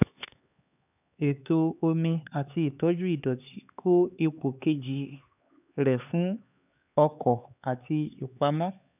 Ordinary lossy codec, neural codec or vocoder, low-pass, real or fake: none; codec, 16 kHz, 4 kbps, X-Codec, HuBERT features, trained on LibriSpeech; 3.6 kHz; fake